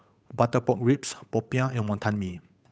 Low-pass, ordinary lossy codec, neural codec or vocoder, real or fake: none; none; codec, 16 kHz, 8 kbps, FunCodec, trained on Chinese and English, 25 frames a second; fake